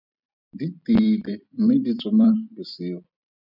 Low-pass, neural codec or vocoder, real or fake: 5.4 kHz; vocoder, 44.1 kHz, 128 mel bands every 256 samples, BigVGAN v2; fake